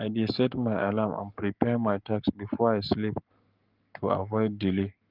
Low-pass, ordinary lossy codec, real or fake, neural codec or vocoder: 5.4 kHz; Opus, 32 kbps; fake; codec, 44.1 kHz, 7.8 kbps, Pupu-Codec